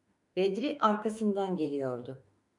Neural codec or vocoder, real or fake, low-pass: autoencoder, 48 kHz, 32 numbers a frame, DAC-VAE, trained on Japanese speech; fake; 10.8 kHz